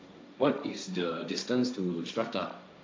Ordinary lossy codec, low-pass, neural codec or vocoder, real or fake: none; none; codec, 16 kHz, 1.1 kbps, Voila-Tokenizer; fake